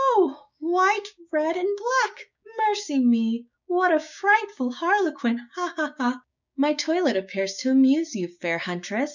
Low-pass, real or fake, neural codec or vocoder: 7.2 kHz; fake; codec, 16 kHz, 6 kbps, DAC